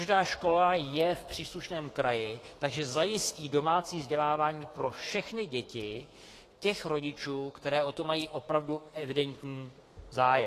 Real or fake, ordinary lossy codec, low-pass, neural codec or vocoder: fake; AAC, 48 kbps; 14.4 kHz; codec, 32 kHz, 1.9 kbps, SNAC